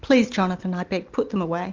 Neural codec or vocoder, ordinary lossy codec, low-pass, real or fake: none; Opus, 32 kbps; 7.2 kHz; real